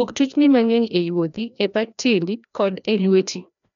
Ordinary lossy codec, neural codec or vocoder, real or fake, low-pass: none; codec, 16 kHz, 1 kbps, FreqCodec, larger model; fake; 7.2 kHz